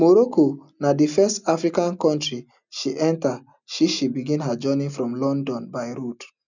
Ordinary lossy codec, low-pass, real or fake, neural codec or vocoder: none; 7.2 kHz; real; none